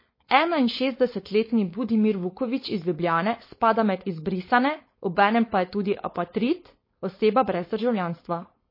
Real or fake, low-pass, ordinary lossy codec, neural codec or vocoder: fake; 5.4 kHz; MP3, 24 kbps; codec, 16 kHz, 4.8 kbps, FACodec